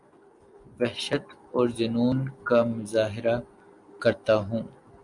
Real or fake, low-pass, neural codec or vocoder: real; 10.8 kHz; none